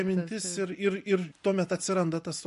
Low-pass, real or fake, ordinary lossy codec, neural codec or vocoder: 14.4 kHz; real; MP3, 48 kbps; none